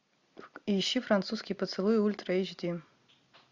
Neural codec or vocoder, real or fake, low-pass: none; real; 7.2 kHz